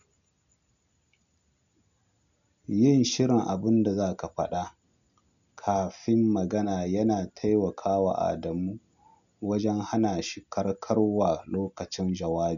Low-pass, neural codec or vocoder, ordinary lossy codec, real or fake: 7.2 kHz; none; none; real